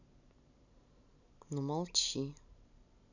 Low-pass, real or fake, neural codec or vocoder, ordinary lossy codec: 7.2 kHz; real; none; none